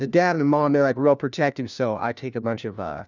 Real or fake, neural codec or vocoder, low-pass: fake; codec, 16 kHz, 1 kbps, FunCodec, trained on LibriTTS, 50 frames a second; 7.2 kHz